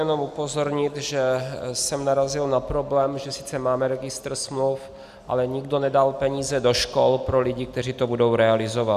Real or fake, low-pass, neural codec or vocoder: real; 14.4 kHz; none